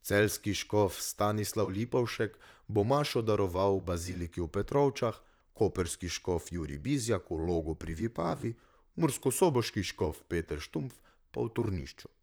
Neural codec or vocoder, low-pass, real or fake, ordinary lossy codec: vocoder, 44.1 kHz, 128 mel bands, Pupu-Vocoder; none; fake; none